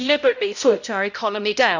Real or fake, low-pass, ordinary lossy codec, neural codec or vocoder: fake; 7.2 kHz; none; codec, 16 kHz, 0.5 kbps, X-Codec, HuBERT features, trained on balanced general audio